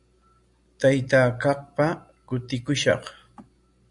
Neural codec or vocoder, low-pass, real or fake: none; 10.8 kHz; real